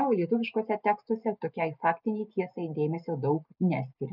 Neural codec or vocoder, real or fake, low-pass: none; real; 5.4 kHz